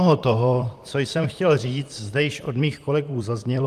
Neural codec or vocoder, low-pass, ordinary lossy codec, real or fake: vocoder, 44.1 kHz, 128 mel bands, Pupu-Vocoder; 14.4 kHz; Opus, 32 kbps; fake